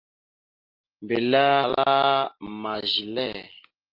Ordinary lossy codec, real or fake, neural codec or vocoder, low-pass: Opus, 24 kbps; real; none; 5.4 kHz